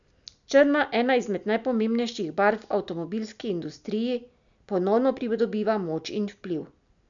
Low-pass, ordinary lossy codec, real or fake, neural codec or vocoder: 7.2 kHz; none; real; none